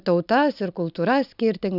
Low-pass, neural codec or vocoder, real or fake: 5.4 kHz; none; real